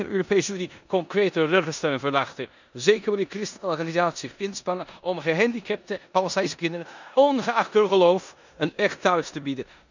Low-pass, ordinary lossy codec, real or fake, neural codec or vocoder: 7.2 kHz; none; fake; codec, 16 kHz in and 24 kHz out, 0.9 kbps, LongCat-Audio-Codec, four codebook decoder